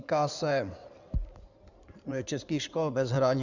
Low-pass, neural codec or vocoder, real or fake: 7.2 kHz; codec, 16 kHz in and 24 kHz out, 2.2 kbps, FireRedTTS-2 codec; fake